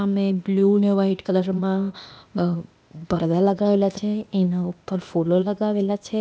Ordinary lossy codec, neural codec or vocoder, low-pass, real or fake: none; codec, 16 kHz, 0.8 kbps, ZipCodec; none; fake